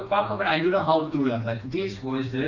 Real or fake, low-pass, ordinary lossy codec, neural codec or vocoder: fake; 7.2 kHz; none; codec, 16 kHz, 2 kbps, FreqCodec, smaller model